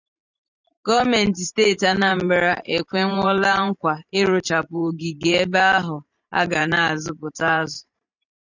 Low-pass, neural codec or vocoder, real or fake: 7.2 kHz; vocoder, 44.1 kHz, 128 mel bands every 512 samples, BigVGAN v2; fake